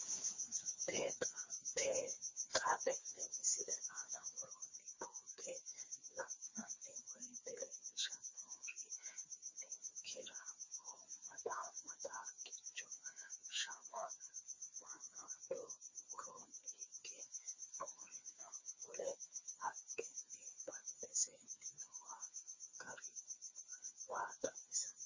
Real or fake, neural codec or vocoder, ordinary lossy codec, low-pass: fake; codec, 16 kHz, 4 kbps, FreqCodec, smaller model; MP3, 32 kbps; 7.2 kHz